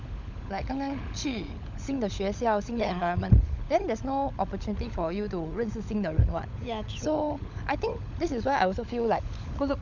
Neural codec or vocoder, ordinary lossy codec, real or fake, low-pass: codec, 16 kHz, 16 kbps, FunCodec, trained on LibriTTS, 50 frames a second; none; fake; 7.2 kHz